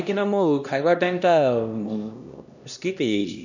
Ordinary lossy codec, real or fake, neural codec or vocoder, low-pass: none; fake; codec, 16 kHz, 1 kbps, X-Codec, HuBERT features, trained on LibriSpeech; 7.2 kHz